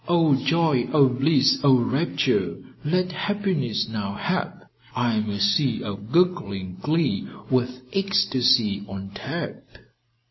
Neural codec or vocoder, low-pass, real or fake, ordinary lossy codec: none; 7.2 kHz; real; MP3, 24 kbps